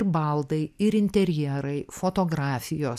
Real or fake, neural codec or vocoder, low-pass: real; none; 14.4 kHz